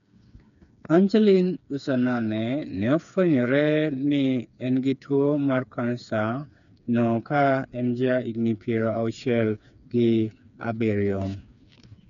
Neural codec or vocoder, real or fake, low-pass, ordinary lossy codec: codec, 16 kHz, 4 kbps, FreqCodec, smaller model; fake; 7.2 kHz; none